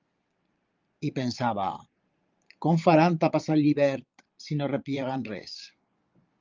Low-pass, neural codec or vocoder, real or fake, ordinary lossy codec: 7.2 kHz; vocoder, 22.05 kHz, 80 mel bands, Vocos; fake; Opus, 32 kbps